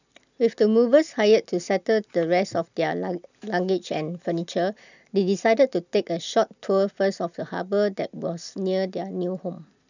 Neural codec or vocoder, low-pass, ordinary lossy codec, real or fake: none; 7.2 kHz; none; real